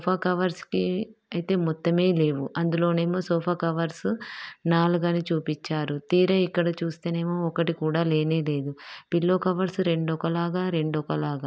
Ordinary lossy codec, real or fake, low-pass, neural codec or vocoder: none; real; none; none